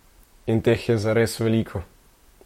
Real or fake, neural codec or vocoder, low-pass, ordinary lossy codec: fake; vocoder, 44.1 kHz, 128 mel bands, Pupu-Vocoder; 19.8 kHz; MP3, 64 kbps